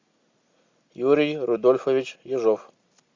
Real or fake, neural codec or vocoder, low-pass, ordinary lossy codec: real; none; 7.2 kHz; MP3, 64 kbps